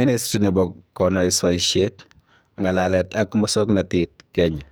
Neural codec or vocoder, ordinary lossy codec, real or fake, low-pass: codec, 44.1 kHz, 2.6 kbps, SNAC; none; fake; none